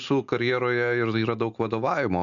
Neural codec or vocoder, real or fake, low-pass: none; real; 7.2 kHz